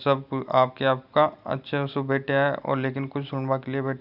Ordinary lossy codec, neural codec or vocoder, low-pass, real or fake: none; none; 5.4 kHz; real